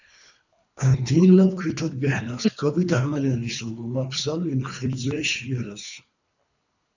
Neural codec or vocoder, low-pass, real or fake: codec, 24 kHz, 3 kbps, HILCodec; 7.2 kHz; fake